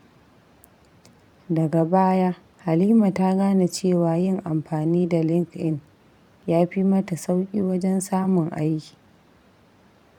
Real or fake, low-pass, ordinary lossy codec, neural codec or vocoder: fake; 19.8 kHz; none; vocoder, 44.1 kHz, 128 mel bands every 512 samples, BigVGAN v2